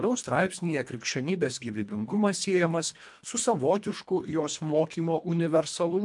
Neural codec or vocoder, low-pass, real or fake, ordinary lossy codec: codec, 24 kHz, 1.5 kbps, HILCodec; 10.8 kHz; fake; MP3, 64 kbps